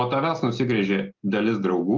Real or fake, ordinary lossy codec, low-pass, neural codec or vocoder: real; Opus, 32 kbps; 7.2 kHz; none